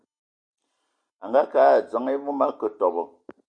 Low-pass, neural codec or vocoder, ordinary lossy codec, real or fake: 9.9 kHz; none; Opus, 64 kbps; real